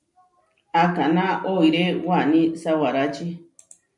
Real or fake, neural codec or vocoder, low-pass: real; none; 10.8 kHz